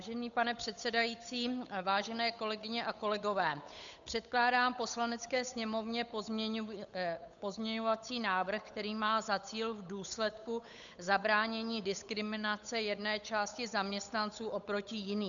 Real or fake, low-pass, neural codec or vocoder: fake; 7.2 kHz; codec, 16 kHz, 8 kbps, FunCodec, trained on Chinese and English, 25 frames a second